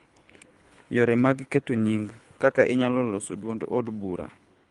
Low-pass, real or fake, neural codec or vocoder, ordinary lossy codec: 10.8 kHz; fake; codec, 24 kHz, 3 kbps, HILCodec; Opus, 32 kbps